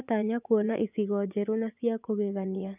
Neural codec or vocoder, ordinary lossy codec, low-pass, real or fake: vocoder, 24 kHz, 100 mel bands, Vocos; none; 3.6 kHz; fake